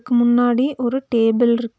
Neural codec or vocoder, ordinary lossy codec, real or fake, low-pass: none; none; real; none